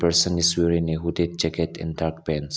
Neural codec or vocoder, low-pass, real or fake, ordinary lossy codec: none; none; real; none